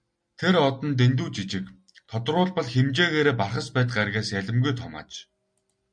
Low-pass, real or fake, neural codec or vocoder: 9.9 kHz; real; none